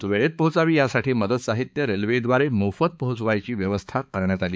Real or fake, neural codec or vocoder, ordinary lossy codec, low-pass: fake; codec, 16 kHz, 4 kbps, X-Codec, HuBERT features, trained on balanced general audio; none; none